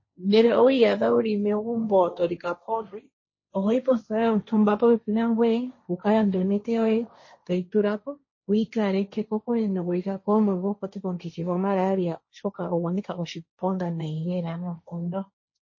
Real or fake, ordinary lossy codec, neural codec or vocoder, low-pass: fake; MP3, 32 kbps; codec, 16 kHz, 1.1 kbps, Voila-Tokenizer; 7.2 kHz